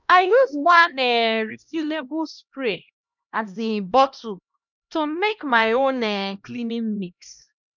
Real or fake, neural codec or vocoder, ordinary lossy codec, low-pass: fake; codec, 16 kHz, 1 kbps, X-Codec, HuBERT features, trained on LibriSpeech; none; 7.2 kHz